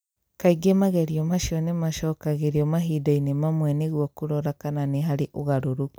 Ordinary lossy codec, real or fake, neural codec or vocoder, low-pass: none; real; none; none